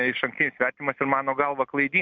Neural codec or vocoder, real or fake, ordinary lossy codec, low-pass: none; real; MP3, 64 kbps; 7.2 kHz